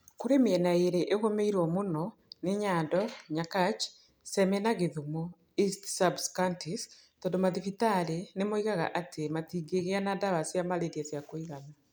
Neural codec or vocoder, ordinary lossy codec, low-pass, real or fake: none; none; none; real